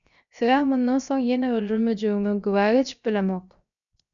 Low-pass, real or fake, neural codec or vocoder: 7.2 kHz; fake; codec, 16 kHz, 0.7 kbps, FocalCodec